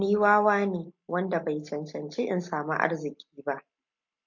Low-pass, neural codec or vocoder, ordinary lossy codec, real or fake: 7.2 kHz; none; MP3, 48 kbps; real